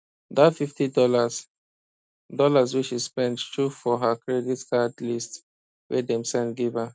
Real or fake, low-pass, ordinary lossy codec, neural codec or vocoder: real; none; none; none